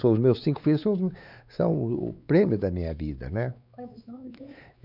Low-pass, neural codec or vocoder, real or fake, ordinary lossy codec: 5.4 kHz; codec, 16 kHz, 4 kbps, X-Codec, WavLM features, trained on Multilingual LibriSpeech; fake; none